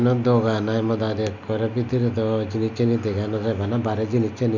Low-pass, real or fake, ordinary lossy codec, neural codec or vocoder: 7.2 kHz; real; none; none